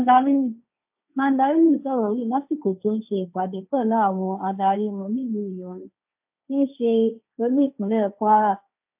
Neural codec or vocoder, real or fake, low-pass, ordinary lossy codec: codec, 16 kHz, 1.1 kbps, Voila-Tokenizer; fake; 3.6 kHz; AAC, 32 kbps